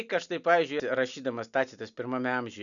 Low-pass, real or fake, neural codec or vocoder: 7.2 kHz; real; none